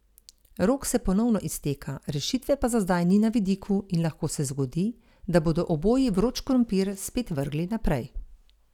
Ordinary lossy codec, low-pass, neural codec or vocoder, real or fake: none; 19.8 kHz; none; real